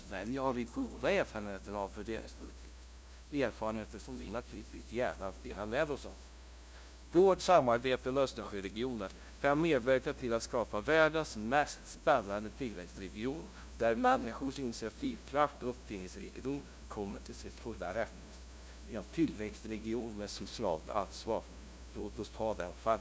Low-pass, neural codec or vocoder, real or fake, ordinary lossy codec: none; codec, 16 kHz, 0.5 kbps, FunCodec, trained on LibriTTS, 25 frames a second; fake; none